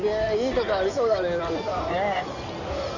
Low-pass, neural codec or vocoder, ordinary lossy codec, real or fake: 7.2 kHz; codec, 16 kHz in and 24 kHz out, 2.2 kbps, FireRedTTS-2 codec; none; fake